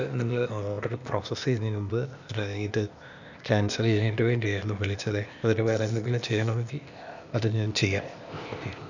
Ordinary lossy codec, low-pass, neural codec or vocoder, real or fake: none; 7.2 kHz; codec, 16 kHz, 0.8 kbps, ZipCodec; fake